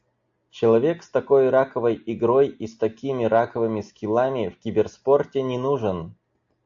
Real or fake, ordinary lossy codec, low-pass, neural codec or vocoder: real; AAC, 64 kbps; 7.2 kHz; none